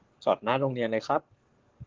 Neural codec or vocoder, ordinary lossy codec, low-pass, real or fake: codec, 44.1 kHz, 7.8 kbps, Pupu-Codec; Opus, 16 kbps; 7.2 kHz; fake